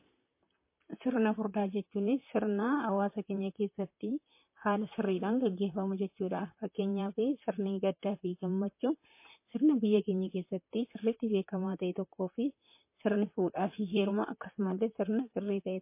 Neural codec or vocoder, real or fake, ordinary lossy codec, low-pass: vocoder, 44.1 kHz, 128 mel bands, Pupu-Vocoder; fake; MP3, 24 kbps; 3.6 kHz